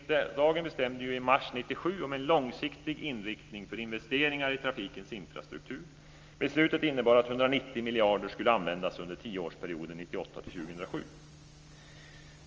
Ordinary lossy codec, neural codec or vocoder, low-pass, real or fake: Opus, 24 kbps; none; 7.2 kHz; real